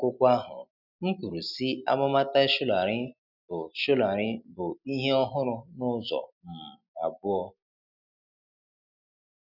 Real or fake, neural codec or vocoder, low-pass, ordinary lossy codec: real; none; 5.4 kHz; none